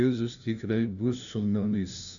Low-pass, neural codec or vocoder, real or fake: 7.2 kHz; codec, 16 kHz, 0.5 kbps, FunCodec, trained on LibriTTS, 25 frames a second; fake